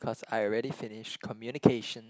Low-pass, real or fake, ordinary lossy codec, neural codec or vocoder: none; real; none; none